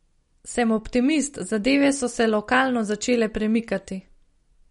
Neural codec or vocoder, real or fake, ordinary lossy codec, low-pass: none; real; MP3, 48 kbps; 10.8 kHz